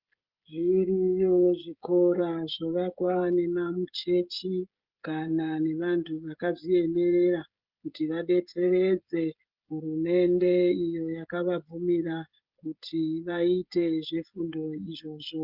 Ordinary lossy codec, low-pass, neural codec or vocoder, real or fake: Opus, 32 kbps; 5.4 kHz; codec, 16 kHz, 16 kbps, FreqCodec, smaller model; fake